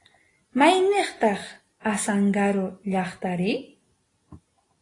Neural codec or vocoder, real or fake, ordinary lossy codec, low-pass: none; real; AAC, 32 kbps; 10.8 kHz